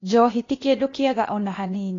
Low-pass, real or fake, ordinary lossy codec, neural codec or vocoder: 7.2 kHz; fake; AAC, 32 kbps; codec, 16 kHz, 0.8 kbps, ZipCodec